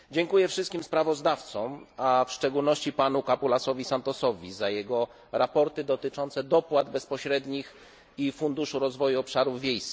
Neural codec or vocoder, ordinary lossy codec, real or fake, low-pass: none; none; real; none